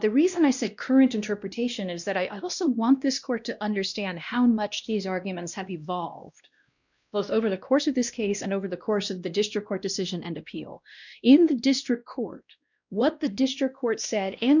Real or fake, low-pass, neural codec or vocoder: fake; 7.2 kHz; codec, 16 kHz, 1 kbps, X-Codec, WavLM features, trained on Multilingual LibriSpeech